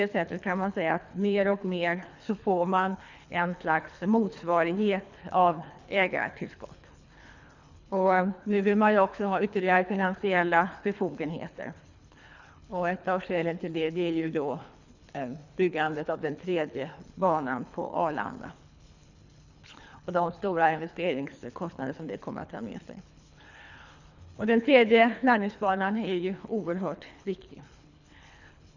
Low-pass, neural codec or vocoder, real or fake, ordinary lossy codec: 7.2 kHz; codec, 24 kHz, 3 kbps, HILCodec; fake; none